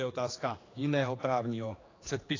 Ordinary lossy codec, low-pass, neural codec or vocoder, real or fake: AAC, 32 kbps; 7.2 kHz; codec, 16 kHz, 2 kbps, X-Codec, HuBERT features, trained on general audio; fake